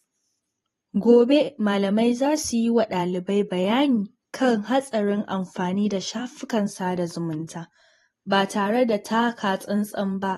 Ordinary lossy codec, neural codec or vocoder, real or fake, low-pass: AAC, 32 kbps; vocoder, 44.1 kHz, 128 mel bands every 512 samples, BigVGAN v2; fake; 19.8 kHz